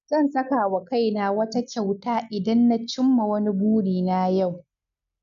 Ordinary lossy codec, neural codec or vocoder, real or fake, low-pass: none; none; real; 7.2 kHz